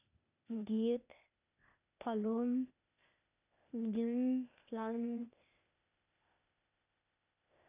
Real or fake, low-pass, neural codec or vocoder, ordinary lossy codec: fake; 3.6 kHz; codec, 16 kHz, 0.8 kbps, ZipCodec; none